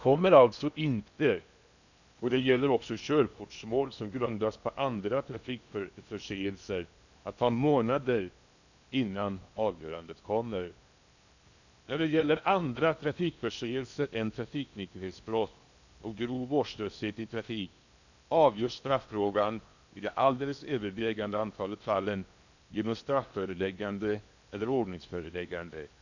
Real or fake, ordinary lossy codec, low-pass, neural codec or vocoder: fake; none; 7.2 kHz; codec, 16 kHz in and 24 kHz out, 0.8 kbps, FocalCodec, streaming, 65536 codes